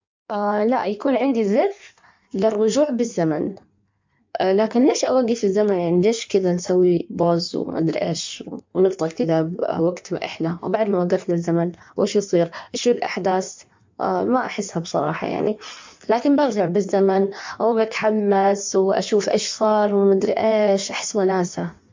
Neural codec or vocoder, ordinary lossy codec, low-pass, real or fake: codec, 16 kHz in and 24 kHz out, 1.1 kbps, FireRedTTS-2 codec; MP3, 64 kbps; 7.2 kHz; fake